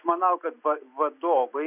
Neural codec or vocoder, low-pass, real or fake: none; 3.6 kHz; real